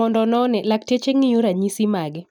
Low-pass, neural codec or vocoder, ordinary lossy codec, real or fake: 19.8 kHz; none; none; real